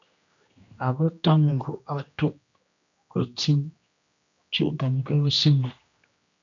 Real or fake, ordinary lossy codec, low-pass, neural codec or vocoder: fake; AAC, 64 kbps; 7.2 kHz; codec, 16 kHz, 1 kbps, X-Codec, HuBERT features, trained on general audio